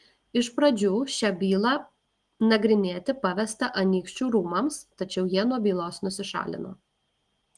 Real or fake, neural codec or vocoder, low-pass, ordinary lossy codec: real; none; 10.8 kHz; Opus, 24 kbps